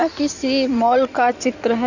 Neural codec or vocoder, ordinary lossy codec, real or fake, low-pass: codec, 16 kHz in and 24 kHz out, 2.2 kbps, FireRedTTS-2 codec; none; fake; 7.2 kHz